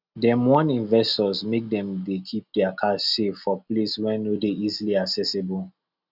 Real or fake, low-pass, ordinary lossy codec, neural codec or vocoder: real; 5.4 kHz; none; none